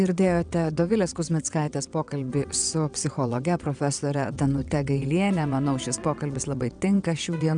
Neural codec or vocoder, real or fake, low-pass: vocoder, 22.05 kHz, 80 mel bands, WaveNeXt; fake; 9.9 kHz